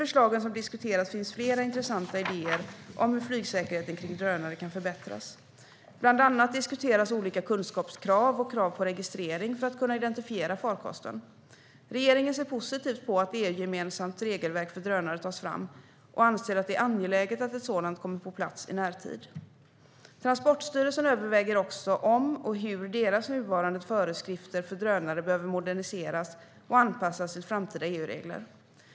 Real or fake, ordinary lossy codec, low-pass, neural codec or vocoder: real; none; none; none